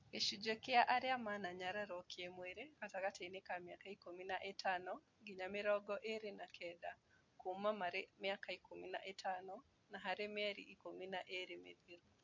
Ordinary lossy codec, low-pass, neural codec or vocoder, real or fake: MP3, 48 kbps; 7.2 kHz; none; real